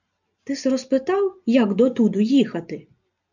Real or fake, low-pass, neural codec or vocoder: real; 7.2 kHz; none